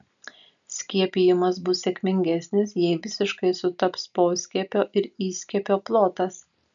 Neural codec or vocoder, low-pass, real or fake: none; 7.2 kHz; real